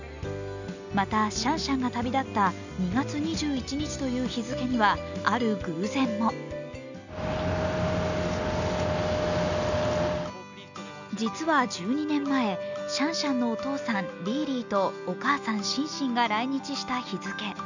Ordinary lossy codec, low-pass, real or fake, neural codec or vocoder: none; 7.2 kHz; real; none